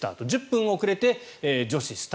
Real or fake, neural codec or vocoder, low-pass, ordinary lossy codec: real; none; none; none